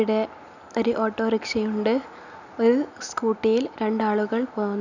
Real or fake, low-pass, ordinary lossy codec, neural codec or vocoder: real; 7.2 kHz; none; none